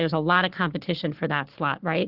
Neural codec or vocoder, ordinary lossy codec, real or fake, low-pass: codec, 16 kHz, 4 kbps, FunCodec, trained on Chinese and English, 50 frames a second; Opus, 16 kbps; fake; 5.4 kHz